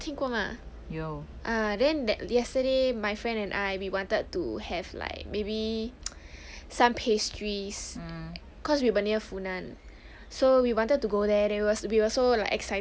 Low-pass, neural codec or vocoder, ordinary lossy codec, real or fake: none; none; none; real